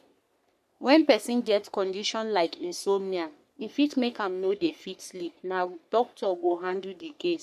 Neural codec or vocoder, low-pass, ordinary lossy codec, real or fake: codec, 44.1 kHz, 3.4 kbps, Pupu-Codec; 14.4 kHz; none; fake